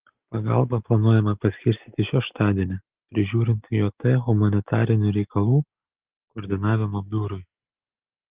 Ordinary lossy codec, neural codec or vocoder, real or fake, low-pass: Opus, 32 kbps; none; real; 3.6 kHz